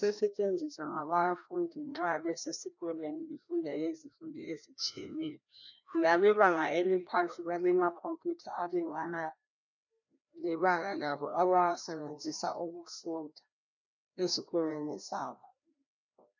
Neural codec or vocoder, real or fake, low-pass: codec, 16 kHz, 1 kbps, FreqCodec, larger model; fake; 7.2 kHz